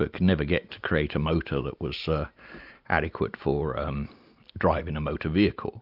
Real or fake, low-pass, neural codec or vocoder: real; 5.4 kHz; none